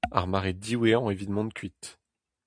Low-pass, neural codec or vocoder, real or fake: 9.9 kHz; none; real